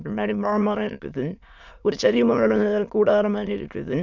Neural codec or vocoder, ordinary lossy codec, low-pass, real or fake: autoencoder, 22.05 kHz, a latent of 192 numbers a frame, VITS, trained on many speakers; none; 7.2 kHz; fake